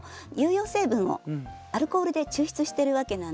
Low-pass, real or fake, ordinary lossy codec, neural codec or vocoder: none; real; none; none